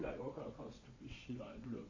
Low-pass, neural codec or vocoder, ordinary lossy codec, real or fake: 7.2 kHz; vocoder, 22.05 kHz, 80 mel bands, WaveNeXt; MP3, 32 kbps; fake